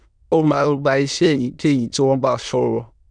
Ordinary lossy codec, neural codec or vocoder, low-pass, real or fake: none; autoencoder, 22.05 kHz, a latent of 192 numbers a frame, VITS, trained on many speakers; 9.9 kHz; fake